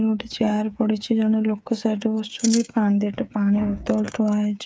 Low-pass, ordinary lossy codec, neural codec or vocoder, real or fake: none; none; codec, 16 kHz, 8 kbps, FreqCodec, smaller model; fake